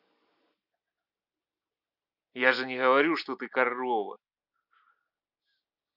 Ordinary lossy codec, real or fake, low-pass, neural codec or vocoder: none; real; 5.4 kHz; none